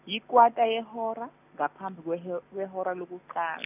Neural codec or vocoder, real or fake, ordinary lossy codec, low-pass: none; real; none; 3.6 kHz